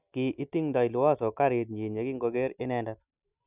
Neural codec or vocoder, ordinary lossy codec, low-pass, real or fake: none; none; 3.6 kHz; real